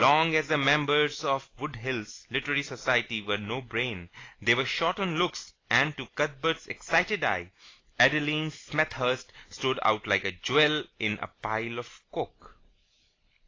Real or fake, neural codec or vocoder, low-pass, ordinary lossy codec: real; none; 7.2 kHz; AAC, 32 kbps